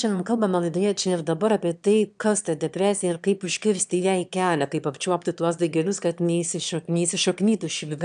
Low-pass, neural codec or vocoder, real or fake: 9.9 kHz; autoencoder, 22.05 kHz, a latent of 192 numbers a frame, VITS, trained on one speaker; fake